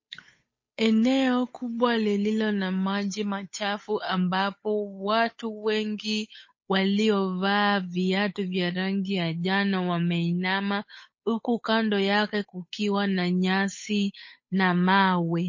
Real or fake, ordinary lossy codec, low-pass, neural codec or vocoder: fake; MP3, 32 kbps; 7.2 kHz; codec, 16 kHz, 8 kbps, FunCodec, trained on Chinese and English, 25 frames a second